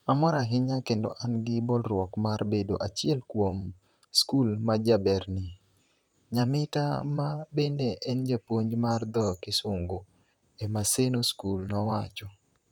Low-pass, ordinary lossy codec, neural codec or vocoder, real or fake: 19.8 kHz; none; vocoder, 44.1 kHz, 128 mel bands, Pupu-Vocoder; fake